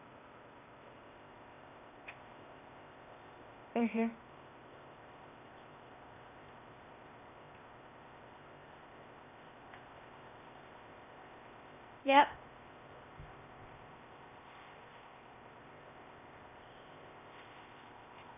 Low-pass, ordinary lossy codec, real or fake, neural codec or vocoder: 3.6 kHz; none; fake; codec, 16 kHz, 0.8 kbps, ZipCodec